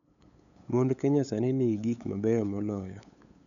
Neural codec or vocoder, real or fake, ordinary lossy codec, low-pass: codec, 16 kHz, 8 kbps, FunCodec, trained on LibriTTS, 25 frames a second; fake; none; 7.2 kHz